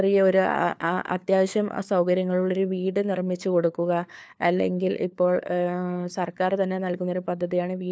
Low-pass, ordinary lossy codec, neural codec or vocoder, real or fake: none; none; codec, 16 kHz, 4 kbps, FunCodec, trained on LibriTTS, 50 frames a second; fake